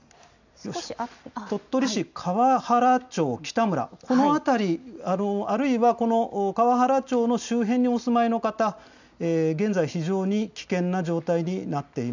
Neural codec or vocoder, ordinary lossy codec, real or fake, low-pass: none; none; real; 7.2 kHz